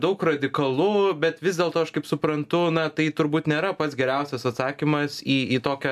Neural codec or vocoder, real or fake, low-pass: none; real; 14.4 kHz